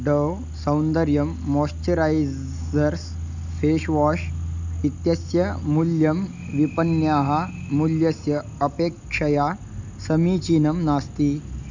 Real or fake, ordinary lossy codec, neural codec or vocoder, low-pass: real; none; none; 7.2 kHz